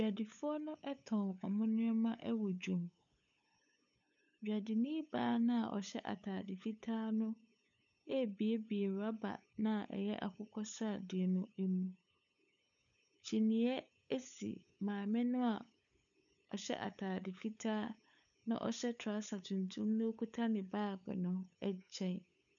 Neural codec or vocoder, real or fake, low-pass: codec, 16 kHz, 8 kbps, FunCodec, trained on LibriTTS, 25 frames a second; fake; 7.2 kHz